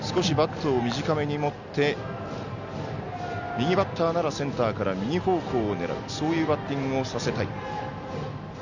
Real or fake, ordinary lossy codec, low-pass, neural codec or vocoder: real; none; 7.2 kHz; none